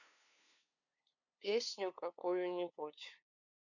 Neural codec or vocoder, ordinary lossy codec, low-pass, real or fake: codec, 16 kHz, 2 kbps, FunCodec, trained on LibriTTS, 25 frames a second; none; 7.2 kHz; fake